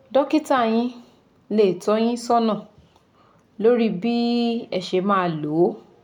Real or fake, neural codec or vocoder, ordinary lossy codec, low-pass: real; none; none; 19.8 kHz